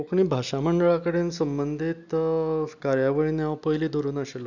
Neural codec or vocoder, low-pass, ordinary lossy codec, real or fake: none; 7.2 kHz; none; real